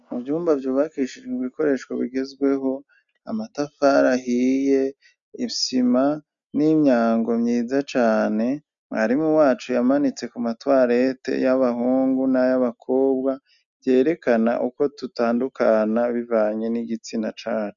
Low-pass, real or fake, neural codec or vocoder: 7.2 kHz; real; none